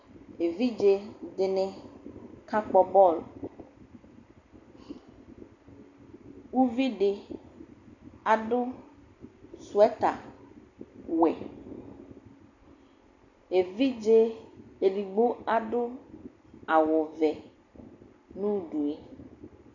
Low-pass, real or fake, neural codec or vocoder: 7.2 kHz; real; none